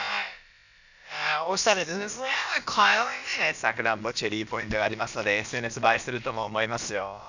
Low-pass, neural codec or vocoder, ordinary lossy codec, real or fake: 7.2 kHz; codec, 16 kHz, about 1 kbps, DyCAST, with the encoder's durations; none; fake